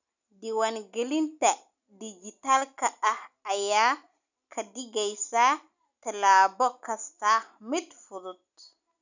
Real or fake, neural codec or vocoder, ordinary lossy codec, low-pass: real; none; none; 7.2 kHz